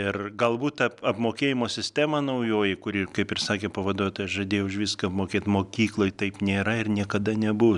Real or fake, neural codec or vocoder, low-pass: real; none; 10.8 kHz